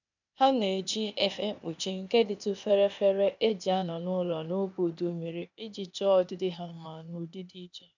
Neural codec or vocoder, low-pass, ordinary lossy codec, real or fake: codec, 16 kHz, 0.8 kbps, ZipCodec; 7.2 kHz; none; fake